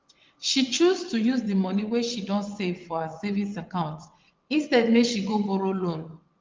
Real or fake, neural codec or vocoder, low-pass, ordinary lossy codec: fake; vocoder, 22.05 kHz, 80 mel bands, WaveNeXt; 7.2 kHz; Opus, 32 kbps